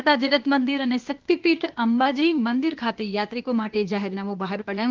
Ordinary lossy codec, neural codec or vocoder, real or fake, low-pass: Opus, 32 kbps; codec, 16 kHz, 0.8 kbps, ZipCodec; fake; 7.2 kHz